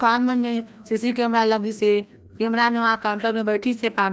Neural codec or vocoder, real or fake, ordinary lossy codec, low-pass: codec, 16 kHz, 1 kbps, FreqCodec, larger model; fake; none; none